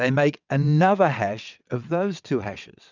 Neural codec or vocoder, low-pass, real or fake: vocoder, 22.05 kHz, 80 mel bands, WaveNeXt; 7.2 kHz; fake